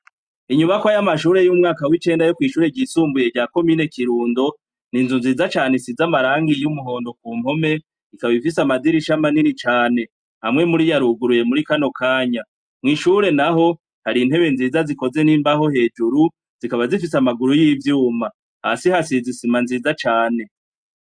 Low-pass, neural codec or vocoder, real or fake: 9.9 kHz; none; real